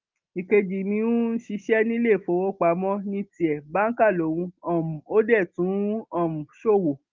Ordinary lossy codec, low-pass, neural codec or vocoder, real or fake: Opus, 32 kbps; 7.2 kHz; none; real